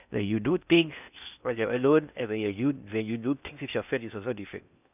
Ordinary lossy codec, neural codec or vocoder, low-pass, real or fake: none; codec, 16 kHz in and 24 kHz out, 0.6 kbps, FocalCodec, streaming, 4096 codes; 3.6 kHz; fake